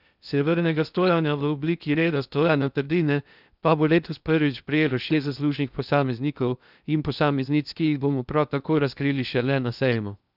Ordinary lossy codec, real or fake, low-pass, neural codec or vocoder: none; fake; 5.4 kHz; codec, 16 kHz in and 24 kHz out, 0.6 kbps, FocalCodec, streaming, 2048 codes